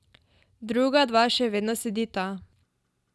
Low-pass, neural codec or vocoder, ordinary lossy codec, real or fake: none; none; none; real